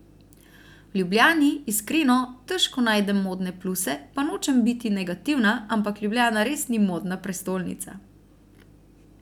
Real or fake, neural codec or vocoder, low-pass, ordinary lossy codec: real; none; 19.8 kHz; none